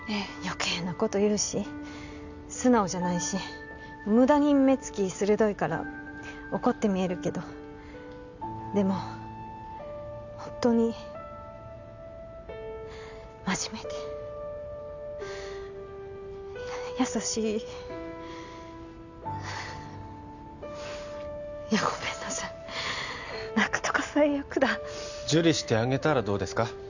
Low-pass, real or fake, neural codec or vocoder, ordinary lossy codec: 7.2 kHz; real; none; none